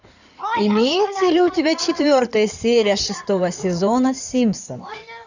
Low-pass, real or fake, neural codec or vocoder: 7.2 kHz; fake; codec, 16 kHz in and 24 kHz out, 2.2 kbps, FireRedTTS-2 codec